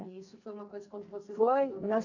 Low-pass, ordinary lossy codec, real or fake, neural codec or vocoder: 7.2 kHz; none; fake; codec, 44.1 kHz, 2.6 kbps, SNAC